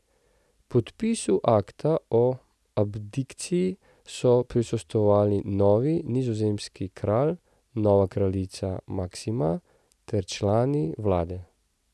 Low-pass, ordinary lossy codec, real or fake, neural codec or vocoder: none; none; real; none